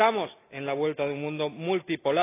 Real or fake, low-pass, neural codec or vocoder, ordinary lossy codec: real; 3.6 kHz; none; AAC, 24 kbps